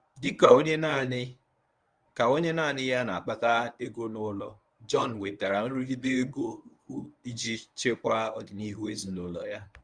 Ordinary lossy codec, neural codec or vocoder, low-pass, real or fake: Opus, 64 kbps; codec, 24 kHz, 0.9 kbps, WavTokenizer, medium speech release version 1; 9.9 kHz; fake